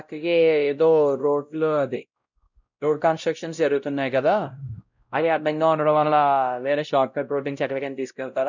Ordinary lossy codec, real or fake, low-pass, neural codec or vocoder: none; fake; 7.2 kHz; codec, 16 kHz, 0.5 kbps, X-Codec, WavLM features, trained on Multilingual LibriSpeech